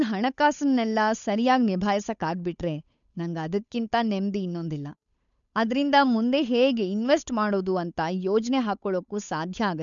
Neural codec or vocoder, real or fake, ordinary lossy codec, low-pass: codec, 16 kHz, 8 kbps, FunCodec, trained on Chinese and English, 25 frames a second; fake; none; 7.2 kHz